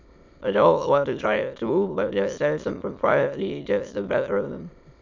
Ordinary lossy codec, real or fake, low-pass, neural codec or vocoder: MP3, 64 kbps; fake; 7.2 kHz; autoencoder, 22.05 kHz, a latent of 192 numbers a frame, VITS, trained on many speakers